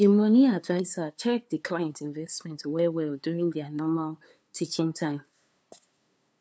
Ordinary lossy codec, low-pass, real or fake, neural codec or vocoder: none; none; fake; codec, 16 kHz, 2 kbps, FunCodec, trained on LibriTTS, 25 frames a second